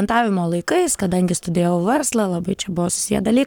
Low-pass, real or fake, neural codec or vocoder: 19.8 kHz; fake; codec, 44.1 kHz, 7.8 kbps, Pupu-Codec